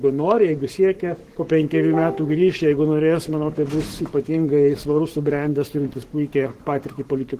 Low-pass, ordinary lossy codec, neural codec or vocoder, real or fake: 14.4 kHz; Opus, 16 kbps; codec, 44.1 kHz, 7.8 kbps, Pupu-Codec; fake